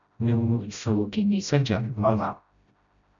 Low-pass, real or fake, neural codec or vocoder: 7.2 kHz; fake; codec, 16 kHz, 0.5 kbps, FreqCodec, smaller model